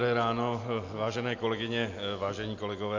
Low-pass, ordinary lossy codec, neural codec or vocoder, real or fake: 7.2 kHz; AAC, 48 kbps; none; real